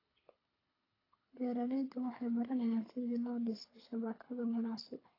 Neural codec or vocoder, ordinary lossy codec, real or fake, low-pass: codec, 24 kHz, 6 kbps, HILCodec; AAC, 24 kbps; fake; 5.4 kHz